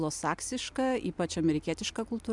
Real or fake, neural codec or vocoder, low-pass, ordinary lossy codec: real; none; 10.8 kHz; MP3, 96 kbps